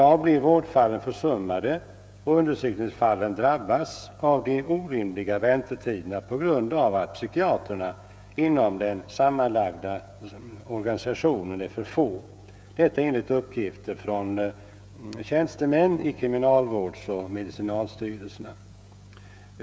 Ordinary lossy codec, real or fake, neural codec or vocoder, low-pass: none; fake; codec, 16 kHz, 16 kbps, FreqCodec, smaller model; none